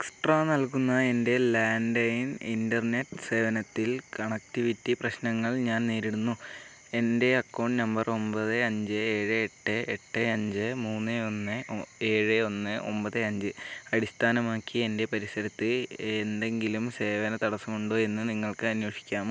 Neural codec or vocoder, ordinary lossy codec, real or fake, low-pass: none; none; real; none